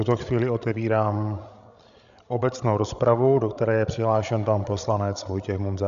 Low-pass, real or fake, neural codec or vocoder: 7.2 kHz; fake; codec, 16 kHz, 16 kbps, FreqCodec, larger model